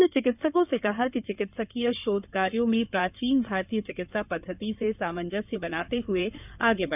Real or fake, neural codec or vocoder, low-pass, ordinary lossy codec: fake; codec, 16 kHz in and 24 kHz out, 2.2 kbps, FireRedTTS-2 codec; 3.6 kHz; none